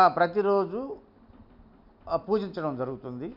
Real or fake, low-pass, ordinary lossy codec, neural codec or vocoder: real; 5.4 kHz; none; none